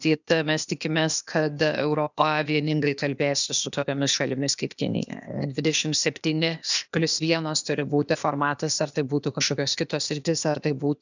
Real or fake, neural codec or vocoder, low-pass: fake; codec, 16 kHz, 0.8 kbps, ZipCodec; 7.2 kHz